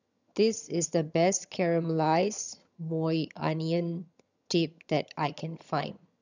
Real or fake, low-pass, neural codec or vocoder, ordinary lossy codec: fake; 7.2 kHz; vocoder, 22.05 kHz, 80 mel bands, HiFi-GAN; none